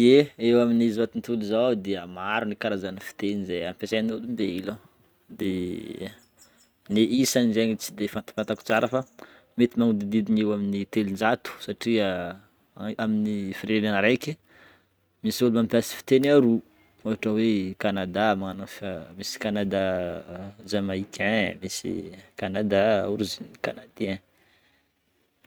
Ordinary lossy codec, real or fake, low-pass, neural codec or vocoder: none; real; none; none